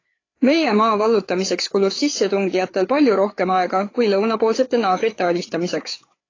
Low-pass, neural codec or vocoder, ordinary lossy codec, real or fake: 7.2 kHz; codec, 16 kHz, 4 kbps, FreqCodec, larger model; AAC, 32 kbps; fake